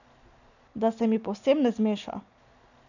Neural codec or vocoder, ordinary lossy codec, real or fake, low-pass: none; none; real; 7.2 kHz